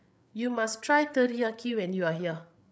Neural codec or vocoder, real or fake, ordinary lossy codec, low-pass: codec, 16 kHz, 8 kbps, FreqCodec, larger model; fake; none; none